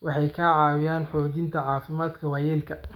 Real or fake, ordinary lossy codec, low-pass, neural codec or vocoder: fake; none; 19.8 kHz; codec, 44.1 kHz, 7.8 kbps, DAC